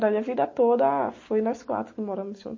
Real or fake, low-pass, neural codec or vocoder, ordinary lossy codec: real; 7.2 kHz; none; MP3, 32 kbps